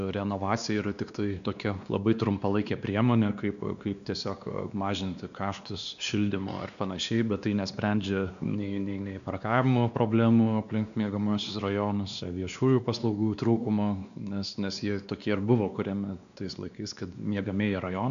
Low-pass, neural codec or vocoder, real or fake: 7.2 kHz; codec, 16 kHz, 2 kbps, X-Codec, WavLM features, trained on Multilingual LibriSpeech; fake